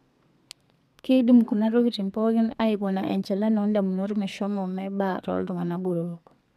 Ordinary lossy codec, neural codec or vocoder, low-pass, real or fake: MP3, 96 kbps; codec, 32 kHz, 1.9 kbps, SNAC; 14.4 kHz; fake